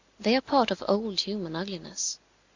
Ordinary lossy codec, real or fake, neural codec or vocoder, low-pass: Opus, 64 kbps; real; none; 7.2 kHz